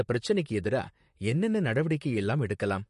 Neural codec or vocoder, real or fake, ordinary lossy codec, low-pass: vocoder, 44.1 kHz, 128 mel bands, Pupu-Vocoder; fake; MP3, 48 kbps; 14.4 kHz